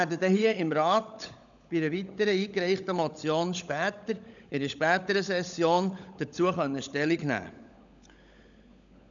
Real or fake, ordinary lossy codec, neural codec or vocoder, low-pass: fake; none; codec, 16 kHz, 16 kbps, FunCodec, trained on LibriTTS, 50 frames a second; 7.2 kHz